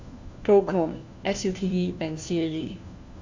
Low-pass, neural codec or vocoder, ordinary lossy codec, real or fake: 7.2 kHz; codec, 16 kHz, 1 kbps, FunCodec, trained on LibriTTS, 50 frames a second; AAC, 48 kbps; fake